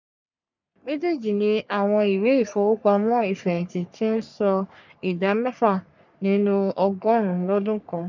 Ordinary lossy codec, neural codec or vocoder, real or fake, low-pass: none; codec, 44.1 kHz, 3.4 kbps, Pupu-Codec; fake; 7.2 kHz